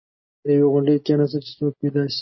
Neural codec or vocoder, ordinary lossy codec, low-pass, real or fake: none; MP3, 24 kbps; 7.2 kHz; real